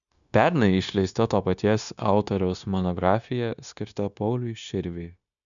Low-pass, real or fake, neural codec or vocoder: 7.2 kHz; fake; codec, 16 kHz, 0.9 kbps, LongCat-Audio-Codec